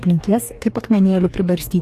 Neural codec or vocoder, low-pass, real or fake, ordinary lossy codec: codec, 44.1 kHz, 2.6 kbps, DAC; 14.4 kHz; fake; AAC, 64 kbps